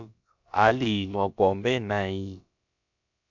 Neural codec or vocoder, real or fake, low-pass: codec, 16 kHz, about 1 kbps, DyCAST, with the encoder's durations; fake; 7.2 kHz